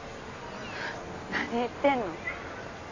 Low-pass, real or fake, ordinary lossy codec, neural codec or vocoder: 7.2 kHz; real; AAC, 32 kbps; none